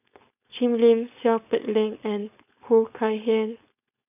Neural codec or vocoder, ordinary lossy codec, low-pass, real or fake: codec, 16 kHz, 4.8 kbps, FACodec; none; 3.6 kHz; fake